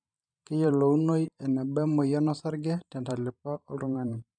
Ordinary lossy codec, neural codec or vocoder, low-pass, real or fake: none; vocoder, 44.1 kHz, 128 mel bands every 512 samples, BigVGAN v2; 9.9 kHz; fake